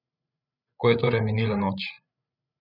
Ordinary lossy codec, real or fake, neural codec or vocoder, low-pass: none; fake; codec, 16 kHz, 16 kbps, FreqCodec, larger model; 5.4 kHz